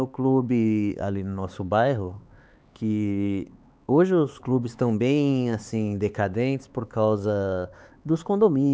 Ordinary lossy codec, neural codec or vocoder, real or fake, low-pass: none; codec, 16 kHz, 4 kbps, X-Codec, HuBERT features, trained on LibriSpeech; fake; none